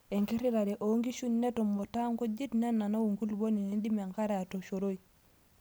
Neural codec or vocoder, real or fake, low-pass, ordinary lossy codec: none; real; none; none